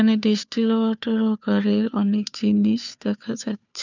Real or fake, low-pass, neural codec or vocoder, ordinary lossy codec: fake; 7.2 kHz; codec, 16 kHz, 2 kbps, FunCodec, trained on Chinese and English, 25 frames a second; none